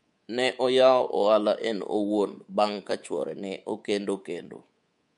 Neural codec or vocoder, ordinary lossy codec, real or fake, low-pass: codec, 24 kHz, 3.1 kbps, DualCodec; MP3, 64 kbps; fake; 10.8 kHz